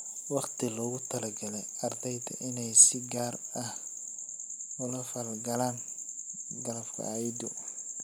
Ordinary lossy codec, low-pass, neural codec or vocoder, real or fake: none; none; none; real